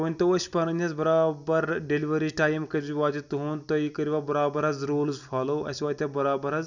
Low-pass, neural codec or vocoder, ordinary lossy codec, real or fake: 7.2 kHz; none; none; real